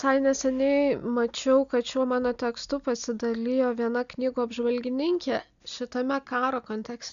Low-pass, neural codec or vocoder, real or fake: 7.2 kHz; none; real